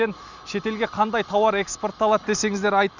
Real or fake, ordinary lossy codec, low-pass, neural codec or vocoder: real; none; 7.2 kHz; none